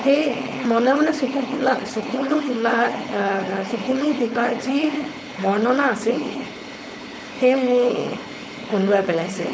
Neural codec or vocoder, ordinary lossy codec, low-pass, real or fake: codec, 16 kHz, 4.8 kbps, FACodec; none; none; fake